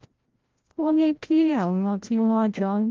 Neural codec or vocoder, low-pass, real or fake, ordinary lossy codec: codec, 16 kHz, 0.5 kbps, FreqCodec, larger model; 7.2 kHz; fake; Opus, 32 kbps